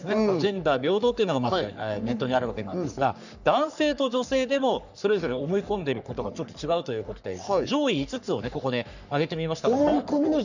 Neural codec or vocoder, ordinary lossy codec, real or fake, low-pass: codec, 44.1 kHz, 3.4 kbps, Pupu-Codec; none; fake; 7.2 kHz